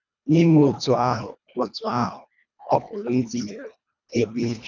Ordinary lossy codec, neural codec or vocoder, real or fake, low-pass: none; codec, 24 kHz, 1.5 kbps, HILCodec; fake; 7.2 kHz